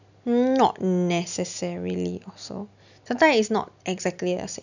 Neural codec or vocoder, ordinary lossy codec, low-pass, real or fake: none; none; 7.2 kHz; real